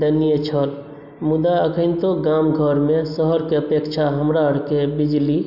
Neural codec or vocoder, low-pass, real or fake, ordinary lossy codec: none; 5.4 kHz; real; none